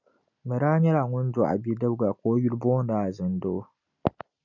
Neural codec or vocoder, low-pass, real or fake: none; 7.2 kHz; real